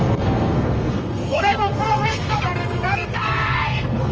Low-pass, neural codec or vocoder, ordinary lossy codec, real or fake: 7.2 kHz; codec, 32 kHz, 1.9 kbps, SNAC; Opus, 24 kbps; fake